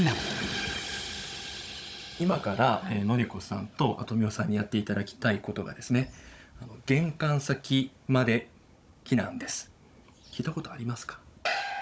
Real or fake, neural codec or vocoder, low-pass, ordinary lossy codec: fake; codec, 16 kHz, 16 kbps, FunCodec, trained on Chinese and English, 50 frames a second; none; none